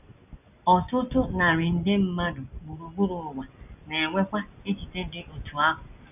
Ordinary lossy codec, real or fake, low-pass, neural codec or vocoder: none; real; 3.6 kHz; none